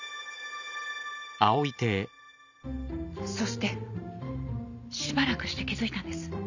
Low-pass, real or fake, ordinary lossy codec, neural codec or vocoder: 7.2 kHz; real; none; none